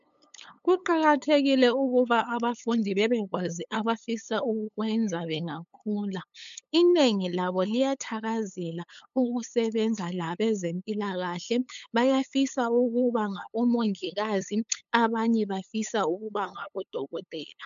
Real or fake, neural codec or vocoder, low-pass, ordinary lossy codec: fake; codec, 16 kHz, 8 kbps, FunCodec, trained on LibriTTS, 25 frames a second; 7.2 kHz; MP3, 64 kbps